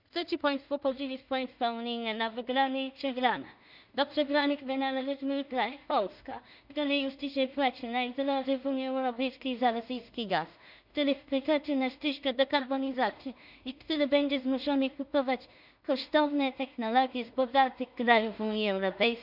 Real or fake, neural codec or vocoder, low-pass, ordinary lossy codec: fake; codec, 16 kHz in and 24 kHz out, 0.4 kbps, LongCat-Audio-Codec, two codebook decoder; 5.4 kHz; none